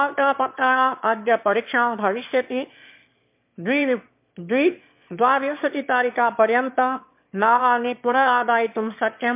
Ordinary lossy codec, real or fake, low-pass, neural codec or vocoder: MP3, 32 kbps; fake; 3.6 kHz; autoencoder, 22.05 kHz, a latent of 192 numbers a frame, VITS, trained on one speaker